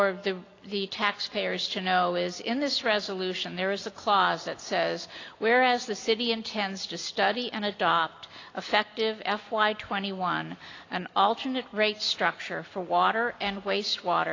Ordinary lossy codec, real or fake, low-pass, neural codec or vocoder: AAC, 32 kbps; real; 7.2 kHz; none